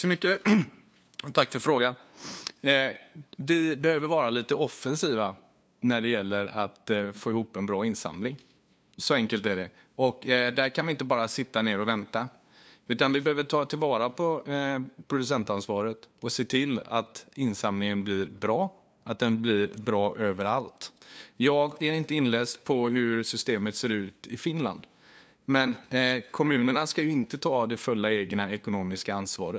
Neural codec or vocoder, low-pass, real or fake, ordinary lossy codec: codec, 16 kHz, 2 kbps, FunCodec, trained on LibriTTS, 25 frames a second; none; fake; none